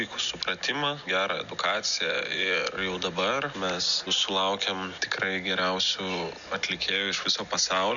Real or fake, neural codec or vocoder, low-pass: real; none; 7.2 kHz